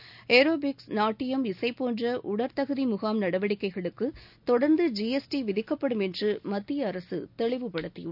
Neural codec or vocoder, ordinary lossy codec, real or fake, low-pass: none; none; real; 5.4 kHz